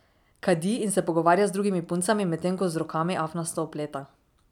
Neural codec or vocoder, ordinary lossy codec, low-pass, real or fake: none; none; 19.8 kHz; real